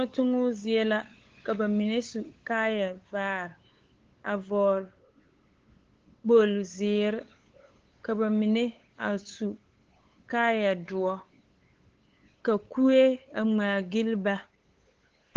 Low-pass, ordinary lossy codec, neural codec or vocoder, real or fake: 7.2 kHz; Opus, 16 kbps; codec, 16 kHz, 8 kbps, FunCodec, trained on LibriTTS, 25 frames a second; fake